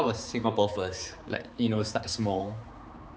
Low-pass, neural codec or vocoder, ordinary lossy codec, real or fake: none; codec, 16 kHz, 4 kbps, X-Codec, HuBERT features, trained on balanced general audio; none; fake